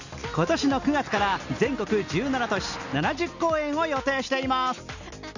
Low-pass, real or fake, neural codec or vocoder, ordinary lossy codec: 7.2 kHz; real; none; none